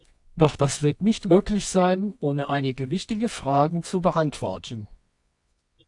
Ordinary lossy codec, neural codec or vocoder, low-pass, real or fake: AAC, 64 kbps; codec, 24 kHz, 0.9 kbps, WavTokenizer, medium music audio release; 10.8 kHz; fake